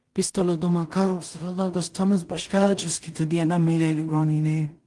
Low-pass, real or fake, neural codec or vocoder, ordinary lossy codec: 10.8 kHz; fake; codec, 16 kHz in and 24 kHz out, 0.4 kbps, LongCat-Audio-Codec, two codebook decoder; Opus, 24 kbps